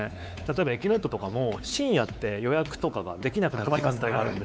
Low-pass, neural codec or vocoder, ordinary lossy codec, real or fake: none; codec, 16 kHz, 4 kbps, X-Codec, WavLM features, trained on Multilingual LibriSpeech; none; fake